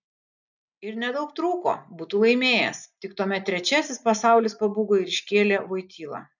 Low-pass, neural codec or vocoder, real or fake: 7.2 kHz; none; real